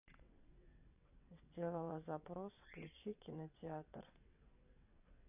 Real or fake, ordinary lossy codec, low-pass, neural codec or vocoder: fake; none; 3.6 kHz; vocoder, 22.05 kHz, 80 mel bands, WaveNeXt